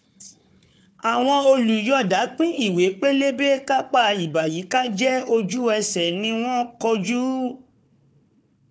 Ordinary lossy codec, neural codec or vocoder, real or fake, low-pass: none; codec, 16 kHz, 4 kbps, FunCodec, trained on Chinese and English, 50 frames a second; fake; none